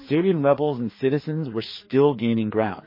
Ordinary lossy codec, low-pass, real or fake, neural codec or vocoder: MP3, 24 kbps; 5.4 kHz; fake; codec, 16 kHz, 2 kbps, FreqCodec, larger model